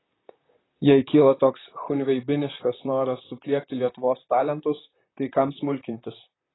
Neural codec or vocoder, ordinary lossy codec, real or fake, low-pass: vocoder, 44.1 kHz, 128 mel bands, Pupu-Vocoder; AAC, 16 kbps; fake; 7.2 kHz